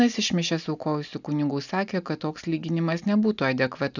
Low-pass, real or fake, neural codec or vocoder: 7.2 kHz; real; none